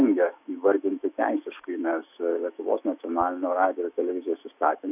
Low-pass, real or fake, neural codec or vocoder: 3.6 kHz; fake; autoencoder, 48 kHz, 128 numbers a frame, DAC-VAE, trained on Japanese speech